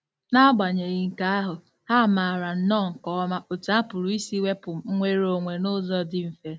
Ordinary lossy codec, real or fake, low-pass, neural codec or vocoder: none; real; none; none